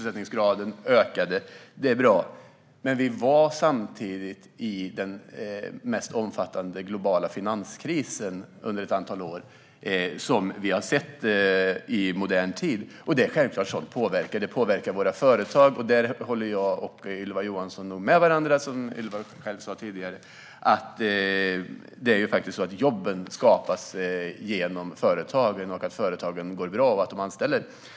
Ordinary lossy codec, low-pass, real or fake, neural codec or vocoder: none; none; real; none